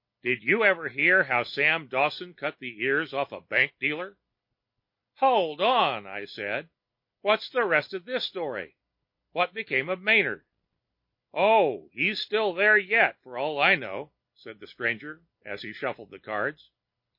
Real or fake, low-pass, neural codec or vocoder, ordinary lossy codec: real; 5.4 kHz; none; MP3, 32 kbps